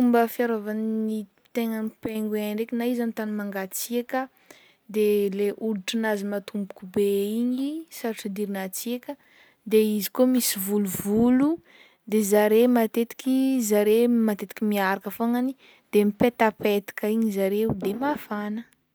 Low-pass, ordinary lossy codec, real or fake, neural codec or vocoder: none; none; real; none